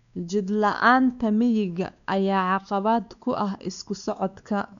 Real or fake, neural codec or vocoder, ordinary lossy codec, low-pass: fake; codec, 16 kHz, 2 kbps, X-Codec, WavLM features, trained on Multilingual LibriSpeech; none; 7.2 kHz